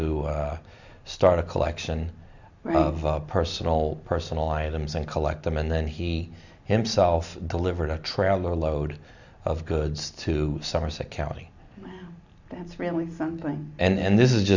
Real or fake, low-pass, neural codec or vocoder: real; 7.2 kHz; none